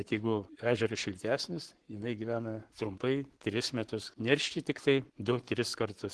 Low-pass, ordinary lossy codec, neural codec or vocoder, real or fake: 10.8 kHz; Opus, 16 kbps; codec, 44.1 kHz, 7.8 kbps, Pupu-Codec; fake